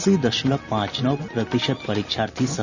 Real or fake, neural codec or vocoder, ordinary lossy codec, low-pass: real; none; none; 7.2 kHz